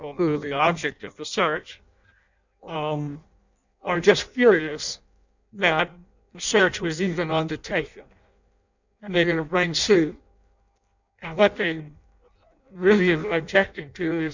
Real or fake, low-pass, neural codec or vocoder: fake; 7.2 kHz; codec, 16 kHz in and 24 kHz out, 0.6 kbps, FireRedTTS-2 codec